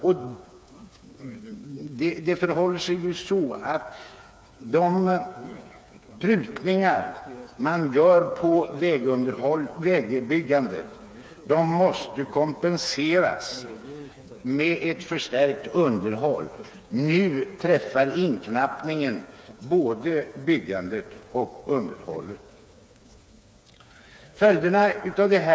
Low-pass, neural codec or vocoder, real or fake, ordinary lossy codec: none; codec, 16 kHz, 4 kbps, FreqCodec, smaller model; fake; none